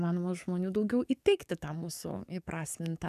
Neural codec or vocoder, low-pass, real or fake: codec, 44.1 kHz, 7.8 kbps, DAC; 14.4 kHz; fake